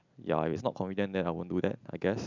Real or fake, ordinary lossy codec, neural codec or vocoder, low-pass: real; none; none; 7.2 kHz